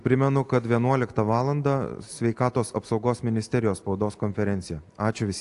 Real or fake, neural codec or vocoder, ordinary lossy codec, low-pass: real; none; AAC, 64 kbps; 10.8 kHz